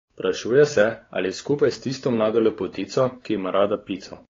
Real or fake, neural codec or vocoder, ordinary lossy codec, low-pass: fake; codec, 16 kHz, 4 kbps, X-Codec, WavLM features, trained on Multilingual LibriSpeech; AAC, 32 kbps; 7.2 kHz